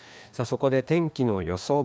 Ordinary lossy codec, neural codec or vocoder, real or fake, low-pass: none; codec, 16 kHz, 2 kbps, FreqCodec, larger model; fake; none